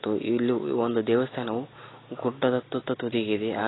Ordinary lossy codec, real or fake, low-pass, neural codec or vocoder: AAC, 16 kbps; real; 7.2 kHz; none